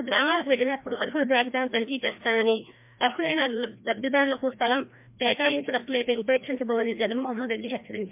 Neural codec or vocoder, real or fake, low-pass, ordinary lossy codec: codec, 16 kHz, 1 kbps, FreqCodec, larger model; fake; 3.6 kHz; MP3, 32 kbps